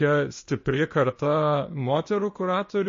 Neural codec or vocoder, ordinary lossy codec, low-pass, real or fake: codec, 16 kHz, 0.8 kbps, ZipCodec; MP3, 32 kbps; 7.2 kHz; fake